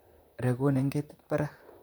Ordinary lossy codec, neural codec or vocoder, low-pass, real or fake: none; vocoder, 44.1 kHz, 128 mel bands, Pupu-Vocoder; none; fake